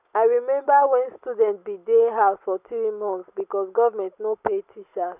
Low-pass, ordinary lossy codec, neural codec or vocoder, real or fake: 3.6 kHz; none; none; real